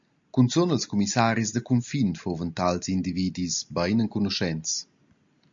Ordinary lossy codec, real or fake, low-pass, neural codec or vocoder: MP3, 64 kbps; real; 7.2 kHz; none